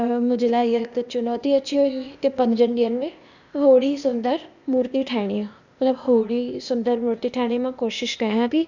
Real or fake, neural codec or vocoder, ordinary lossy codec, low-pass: fake; codec, 16 kHz, 0.8 kbps, ZipCodec; none; 7.2 kHz